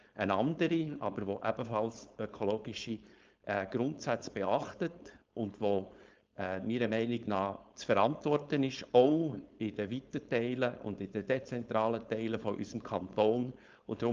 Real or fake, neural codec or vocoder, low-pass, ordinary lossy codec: fake; codec, 16 kHz, 4.8 kbps, FACodec; 7.2 kHz; Opus, 24 kbps